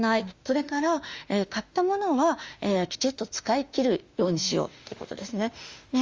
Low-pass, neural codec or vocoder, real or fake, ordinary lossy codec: 7.2 kHz; autoencoder, 48 kHz, 32 numbers a frame, DAC-VAE, trained on Japanese speech; fake; Opus, 32 kbps